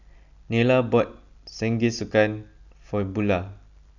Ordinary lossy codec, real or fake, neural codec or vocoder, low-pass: none; real; none; 7.2 kHz